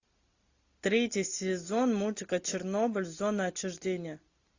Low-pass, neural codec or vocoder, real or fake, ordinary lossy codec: 7.2 kHz; none; real; AAC, 48 kbps